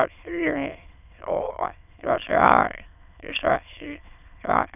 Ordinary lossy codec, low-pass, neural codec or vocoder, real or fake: none; 3.6 kHz; autoencoder, 22.05 kHz, a latent of 192 numbers a frame, VITS, trained on many speakers; fake